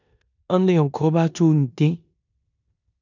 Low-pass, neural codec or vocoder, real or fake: 7.2 kHz; codec, 16 kHz in and 24 kHz out, 0.9 kbps, LongCat-Audio-Codec, four codebook decoder; fake